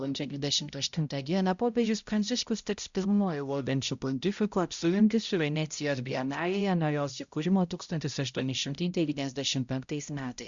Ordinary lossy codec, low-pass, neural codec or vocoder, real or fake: Opus, 64 kbps; 7.2 kHz; codec, 16 kHz, 0.5 kbps, X-Codec, HuBERT features, trained on balanced general audio; fake